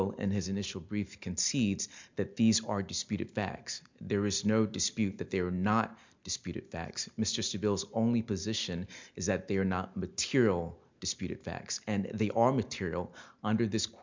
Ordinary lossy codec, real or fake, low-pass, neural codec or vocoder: MP3, 64 kbps; real; 7.2 kHz; none